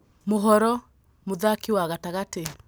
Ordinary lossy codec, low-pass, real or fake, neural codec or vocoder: none; none; real; none